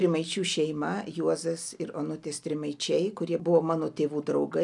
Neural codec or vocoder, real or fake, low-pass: none; real; 10.8 kHz